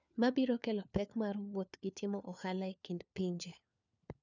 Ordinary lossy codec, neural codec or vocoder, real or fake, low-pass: none; codec, 16 kHz, 4 kbps, FunCodec, trained on LibriTTS, 50 frames a second; fake; 7.2 kHz